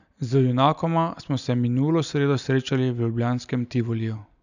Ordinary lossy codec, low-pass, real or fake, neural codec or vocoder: none; 7.2 kHz; real; none